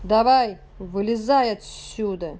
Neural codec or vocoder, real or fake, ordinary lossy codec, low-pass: none; real; none; none